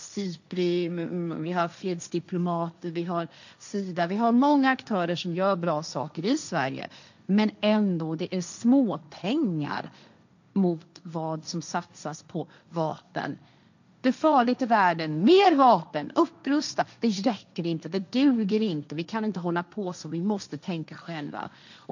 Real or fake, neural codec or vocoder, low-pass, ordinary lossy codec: fake; codec, 16 kHz, 1.1 kbps, Voila-Tokenizer; 7.2 kHz; none